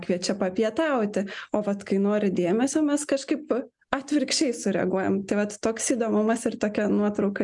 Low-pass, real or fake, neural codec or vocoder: 10.8 kHz; real; none